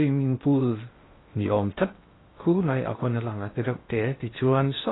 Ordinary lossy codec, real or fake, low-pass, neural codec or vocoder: AAC, 16 kbps; fake; 7.2 kHz; codec, 16 kHz in and 24 kHz out, 0.6 kbps, FocalCodec, streaming, 2048 codes